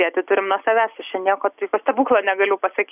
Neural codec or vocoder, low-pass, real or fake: none; 3.6 kHz; real